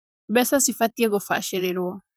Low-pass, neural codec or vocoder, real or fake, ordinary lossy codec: none; vocoder, 44.1 kHz, 128 mel bands, Pupu-Vocoder; fake; none